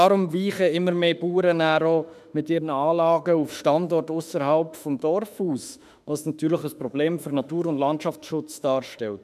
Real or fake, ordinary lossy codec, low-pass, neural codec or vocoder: fake; none; 14.4 kHz; autoencoder, 48 kHz, 32 numbers a frame, DAC-VAE, trained on Japanese speech